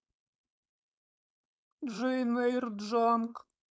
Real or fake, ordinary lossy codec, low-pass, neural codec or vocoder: fake; none; none; codec, 16 kHz, 4.8 kbps, FACodec